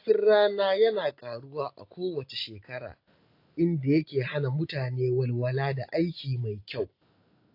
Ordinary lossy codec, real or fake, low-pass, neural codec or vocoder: AAC, 32 kbps; real; 5.4 kHz; none